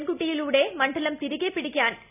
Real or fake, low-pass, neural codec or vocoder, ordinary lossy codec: real; 3.6 kHz; none; none